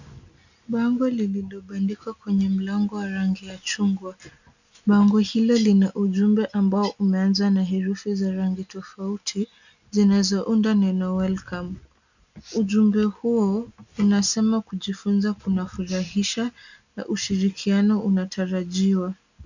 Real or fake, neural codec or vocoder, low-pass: real; none; 7.2 kHz